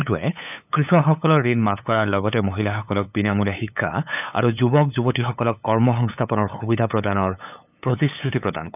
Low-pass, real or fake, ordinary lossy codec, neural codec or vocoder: 3.6 kHz; fake; none; codec, 16 kHz, 8 kbps, FunCodec, trained on LibriTTS, 25 frames a second